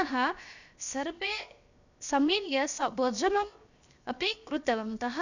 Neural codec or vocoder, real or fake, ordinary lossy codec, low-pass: codec, 16 kHz, 0.7 kbps, FocalCodec; fake; none; 7.2 kHz